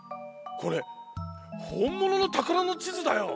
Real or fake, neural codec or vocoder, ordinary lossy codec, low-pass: real; none; none; none